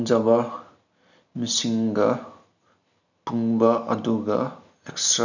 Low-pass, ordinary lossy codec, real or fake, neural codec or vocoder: 7.2 kHz; none; real; none